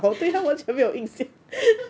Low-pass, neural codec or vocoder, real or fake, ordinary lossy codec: none; none; real; none